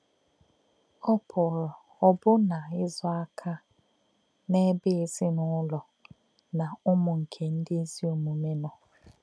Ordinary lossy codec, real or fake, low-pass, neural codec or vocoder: none; real; none; none